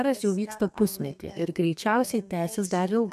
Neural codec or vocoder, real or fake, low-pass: codec, 32 kHz, 1.9 kbps, SNAC; fake; 14.4 kHz